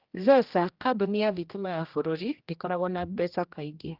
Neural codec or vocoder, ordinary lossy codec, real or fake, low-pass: codec, 16 kHz, 1 kbps, X-Codec, HuBERT features, trained on general audio; Opus, 32 kbps; fake; 5.4 kHz